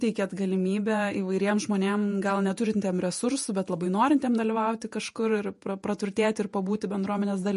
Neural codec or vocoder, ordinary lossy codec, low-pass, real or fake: vocoder, 48 kHz, 128 mel bands, Vocos; MP3, 48 kbps; 14.4 kHz; fake